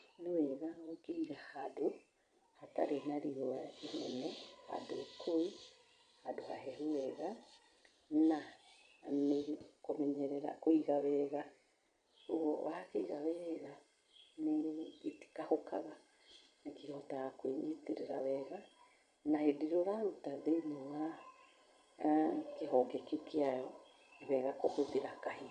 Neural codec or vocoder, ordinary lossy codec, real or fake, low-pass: vocoder, 22.05 kHz, 80 mel bands, Vocos; none; fake; 9.9 kHz